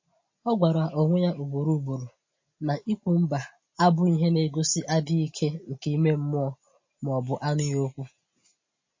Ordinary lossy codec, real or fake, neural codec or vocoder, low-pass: MP3, 32 kbps; real; none; 7.2 kHz